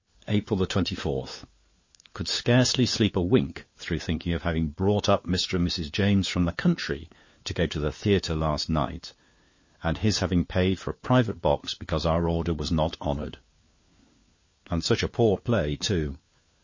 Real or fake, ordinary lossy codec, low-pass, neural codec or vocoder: fake; MP3, 32 kbps; 7.2 kHz; codec, 16 kHz, 4 kbps, FunCodec, trained on LibriTTS, 50 frames a second